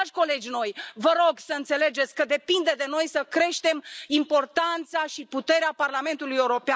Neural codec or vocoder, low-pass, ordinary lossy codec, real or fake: none; none; none; real